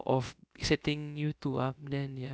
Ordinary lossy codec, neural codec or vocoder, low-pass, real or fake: none; codec, 16 kHz, about 1 kbps, DyCAST, with the encoder's durations; none; fake